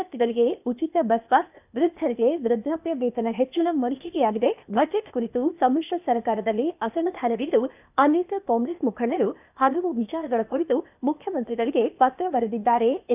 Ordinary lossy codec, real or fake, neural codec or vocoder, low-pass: none; fake; codec, 16 kHz, 0.8 kbps, ZipCodec; 3.6 kHz